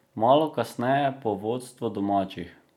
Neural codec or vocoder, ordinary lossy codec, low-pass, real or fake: none; none; 19.8 kHz; real